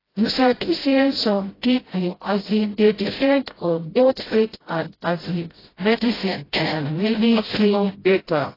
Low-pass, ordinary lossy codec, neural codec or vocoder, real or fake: 5.4 kHz; AAC, 24 kbps; codec, 16 kHz, 0.5 kbps, FreqCodec, smaller model; fake